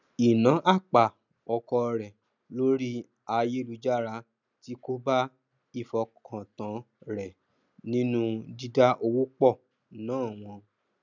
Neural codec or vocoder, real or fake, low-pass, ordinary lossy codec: none; real; 7.2 kHz; none